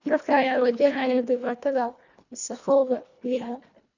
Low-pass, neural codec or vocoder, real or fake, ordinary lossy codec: 7.2 kHz; codec, 24 kHz, 1.5 kbps, HILCodec; fake; none